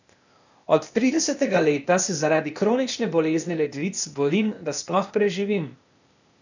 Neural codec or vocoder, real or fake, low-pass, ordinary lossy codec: codec, 16 kHz, 0.8 kbps, ZipCodec; fake; 7.2 kHz; none